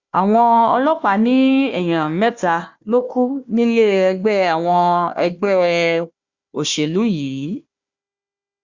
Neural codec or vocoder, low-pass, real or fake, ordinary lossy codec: codec, 16 kHz, 1 kbps, FunCodec, trained on Chinese and English, 50 frames a second; 7.2 kHz; fake; Opus, 64 kbps